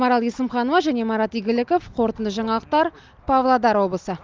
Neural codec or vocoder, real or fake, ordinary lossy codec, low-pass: none; real; Opus, 32 kbps; 7.2 kHz